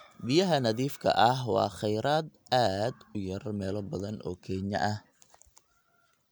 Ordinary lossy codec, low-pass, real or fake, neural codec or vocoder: none; none; real; none